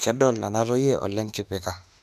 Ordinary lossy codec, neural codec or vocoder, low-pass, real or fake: none; autoencoder, 48 kHz, 32 numbers a frame, DAC-VAE, trained on Japanese speech; 14.4 kHz; fake